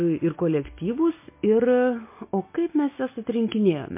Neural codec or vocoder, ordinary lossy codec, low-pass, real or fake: none; MP3, 24 kbps; 3.6 kHz; real